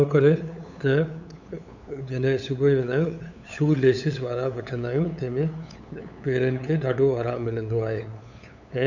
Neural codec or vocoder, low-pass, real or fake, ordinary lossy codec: codec, 16 kHz, 8 kbps, FunCodec, trained on LibriTTS, 25 frames a second; 7.2 kHz; fake; none